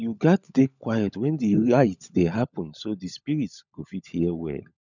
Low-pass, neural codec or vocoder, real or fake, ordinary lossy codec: 7.2 kHz; codec, 16 kHz, 16 kbps, FunCodec, trained on LibriTTS, 50 frames a second; fake; none